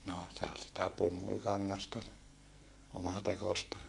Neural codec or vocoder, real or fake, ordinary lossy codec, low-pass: codec, 44.1 kHz, 2.6 kbps, SNAC; fake; MP3, 64 kbps; 10.8 kHz